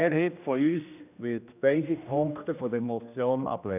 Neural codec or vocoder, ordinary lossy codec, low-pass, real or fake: codec, 16 kHz, 1 kbps, X-Codec, HuBERT features, trained on general audio; none; 3.6 kHz; fake